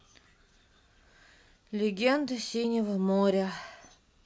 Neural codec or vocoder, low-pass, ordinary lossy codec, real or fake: none; none; none; real